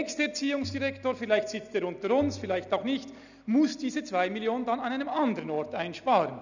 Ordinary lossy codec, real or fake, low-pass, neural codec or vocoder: none; real; 7.2 kHz; none